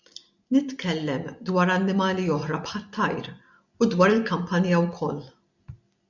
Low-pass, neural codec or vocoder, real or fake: 7.2 kHz; none; real